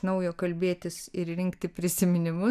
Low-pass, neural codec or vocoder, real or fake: 14.4 kHz; none; real